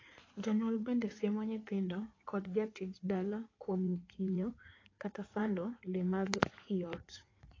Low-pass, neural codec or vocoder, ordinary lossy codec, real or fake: 7.2 kHz; codec, 16 kHz in and 24 kHz out, 2.2 kbps, FireRedTTS-2 codec; AAC, 32 kbps; fake